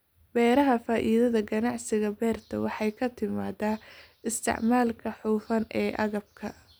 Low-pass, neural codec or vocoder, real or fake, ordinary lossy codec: none; none; real; none